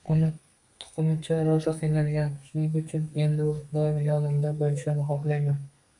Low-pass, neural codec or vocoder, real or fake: 10.8 kHz; codec, 32 kHz, 1.9 kbps, SNAC; fake